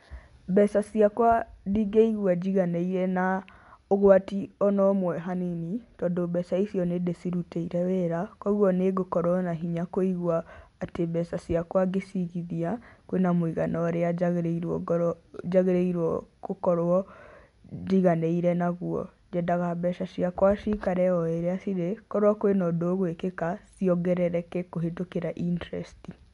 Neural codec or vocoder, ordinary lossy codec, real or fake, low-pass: none; MP3, 64 kbps; real; 10.8 kHz